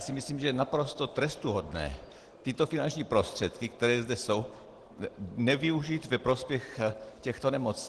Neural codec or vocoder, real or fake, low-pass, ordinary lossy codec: none; real; 10.8 kHz; Opus, 16 kbps